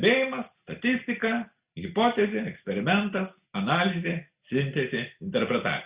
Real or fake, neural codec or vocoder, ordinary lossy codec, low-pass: real; none; Opus, 64 kbps; 3.6 kHz